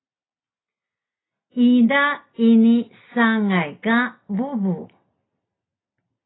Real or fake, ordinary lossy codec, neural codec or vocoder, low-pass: real; AAC, 16 kbps; none; 7.2 kHz